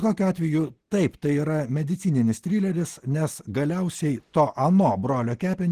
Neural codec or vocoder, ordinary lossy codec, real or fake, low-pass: none; Opus, 16 kbps; real; 14.4 kHz